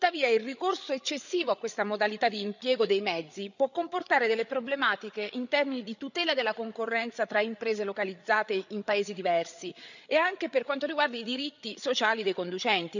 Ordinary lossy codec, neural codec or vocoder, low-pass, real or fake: none; codec, 16 kHz, 8 kbps, FreqCodec, larger model; 7.2 kHz; fake